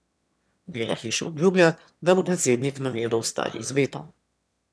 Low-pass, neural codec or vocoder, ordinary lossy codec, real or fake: none; autoencoder, 22.05 kHz, a latent of 192 numbers a frame, VITS, trained on one speaker; none; fake